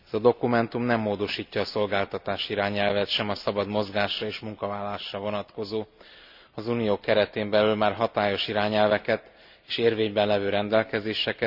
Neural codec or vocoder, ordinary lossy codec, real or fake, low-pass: none; none; real; 5.4 kHz